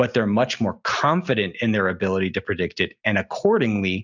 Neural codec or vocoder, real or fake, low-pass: none; real; 7.2 kHz